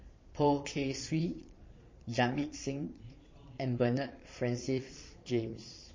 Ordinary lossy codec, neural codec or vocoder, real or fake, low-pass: MP3, 32 kbps; vocoder, 22.05 kHz, 80 mel bands, Vocos; fake; 7.2 kHz